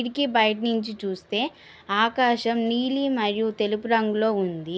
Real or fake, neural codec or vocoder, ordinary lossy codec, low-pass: real; none; none; none